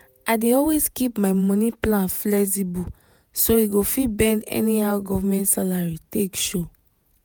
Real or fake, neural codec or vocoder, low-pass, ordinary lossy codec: fake; vocoder, 48 kHz, 128 mel bands, Vocos; none; none